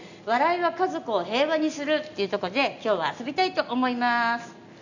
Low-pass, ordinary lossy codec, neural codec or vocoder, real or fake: 7.2 kHz; none; none; real